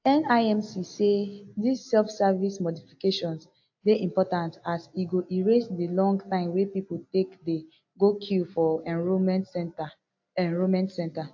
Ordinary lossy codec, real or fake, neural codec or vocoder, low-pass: AAC, 48 kbps; real; none; 7.2 kHz